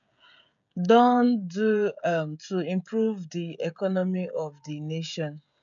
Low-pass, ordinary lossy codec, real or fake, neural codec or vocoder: 7.2 kHz; none; fake; codec, 16 kHz, 16 kbps, FreqCodec, smaller model